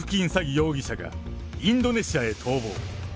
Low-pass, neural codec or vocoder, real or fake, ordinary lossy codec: none; none; real; none